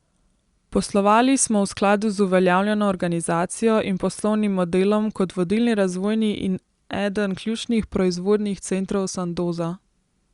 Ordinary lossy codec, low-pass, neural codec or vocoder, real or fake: Opus, 64 kbps; 10.8 kHz; none; real